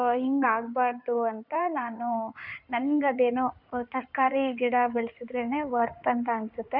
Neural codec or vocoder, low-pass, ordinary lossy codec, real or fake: codec, 16 kHz in and 24 kHz out, 2.2 kbps, FireRedTTS-2 codec; 5.4 kHz; none; fake